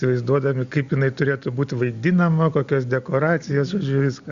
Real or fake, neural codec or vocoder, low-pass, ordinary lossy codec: real; none; 7.2 kHz; Opus, 64 kbps